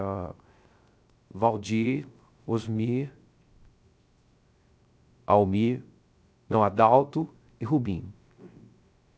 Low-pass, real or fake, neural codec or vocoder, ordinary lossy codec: none; fake; codec, 16 kHz, 0.3 kbps, FocalCodec; none